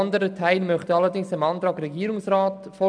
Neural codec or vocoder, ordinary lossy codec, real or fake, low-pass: none; none; real; 9.9 kHz